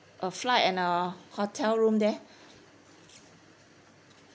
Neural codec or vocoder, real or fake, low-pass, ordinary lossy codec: none; real; none; none